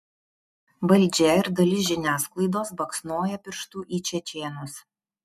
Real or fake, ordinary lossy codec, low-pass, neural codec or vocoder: real; MP3, 96 kbps; 14.4 kHz; none